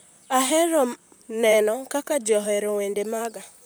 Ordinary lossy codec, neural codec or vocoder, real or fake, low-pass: none; vocoder, 44.1 kHz, 128 mel bands, Pupu-Vocoder; fake; none